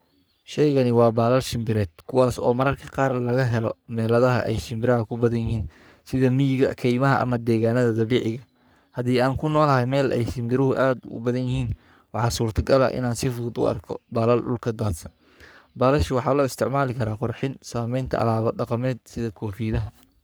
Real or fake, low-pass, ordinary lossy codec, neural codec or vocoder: fake; none; none; codec, 44.1 kHz, 3.4 kbps, Pupu-Codec